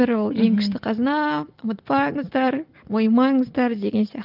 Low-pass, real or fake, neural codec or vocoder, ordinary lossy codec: 5.4 kHz; real; none; Opus, 32 kbps